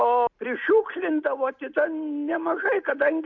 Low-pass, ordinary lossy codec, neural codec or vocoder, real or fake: 7.2 kHz; MP3, 48 kbps; none; real